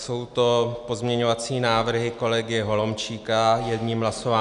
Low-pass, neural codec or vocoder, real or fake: 10.8 kHz; none; real